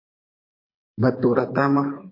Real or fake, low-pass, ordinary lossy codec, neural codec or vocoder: fake; 5.4 kHz; MP3, 24 kbps; codec, 24 kHz, 6 kbps, HILCodec